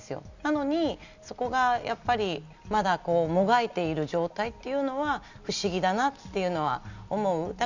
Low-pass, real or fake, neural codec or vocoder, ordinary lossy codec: 7.2 kHz; real; none; none